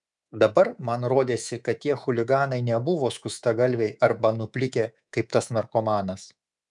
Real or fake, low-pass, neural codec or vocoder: fake; 10.8 kHz; codec, 24 kHz, 3.1 kbps, DualCodec